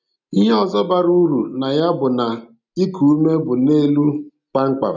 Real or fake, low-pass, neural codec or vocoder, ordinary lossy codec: real; 7.2 kHz; none; none